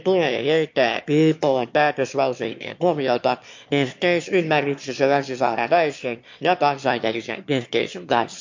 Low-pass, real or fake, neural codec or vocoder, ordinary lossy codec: 7.2 kHz; fake; autoencoder, 22.05 kHz, a latent of 192 numbers a frame, VITS, trained on one speaker; MP3, 48 kbps